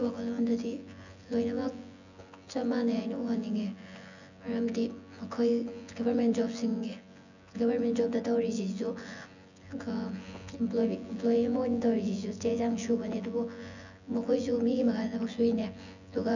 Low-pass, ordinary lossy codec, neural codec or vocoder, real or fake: 7.2 kHz; none; vocoder, 24 kHz, 100 mel bands, Vocos; fake